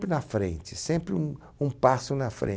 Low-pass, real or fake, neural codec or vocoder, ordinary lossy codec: none; real; none; none